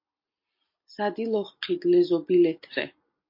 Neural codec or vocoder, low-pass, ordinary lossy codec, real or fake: none; 5.4 kHz; MP3, 32 kbps; real